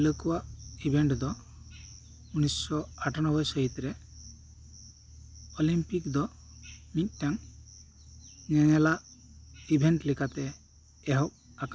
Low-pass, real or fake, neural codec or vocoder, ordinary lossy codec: none; real; none; none